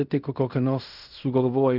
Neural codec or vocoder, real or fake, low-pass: codec, 16 kHz in and 24 kHz out, 0.4 kbps, LongCat-Audio-Codec, fine tuned four codebook decoder; fake; 5.4 kHz